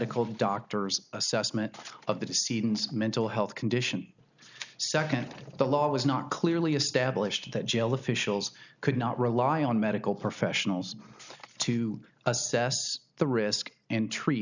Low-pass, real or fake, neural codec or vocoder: 7.2 kHz; real; none